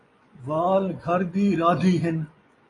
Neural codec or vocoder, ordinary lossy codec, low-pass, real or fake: vocoder, 24 kHz, 100 mel bands, Vocos; AAC, 32 kbps; 10.8 kHz; fake